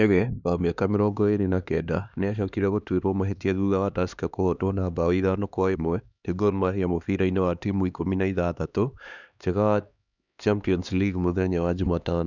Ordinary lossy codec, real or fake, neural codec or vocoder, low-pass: none; fake; codec, 16 kHz, 2 kbps, X-Codec, HuBERT features, trained on LibriSpeech; 7.2 kHz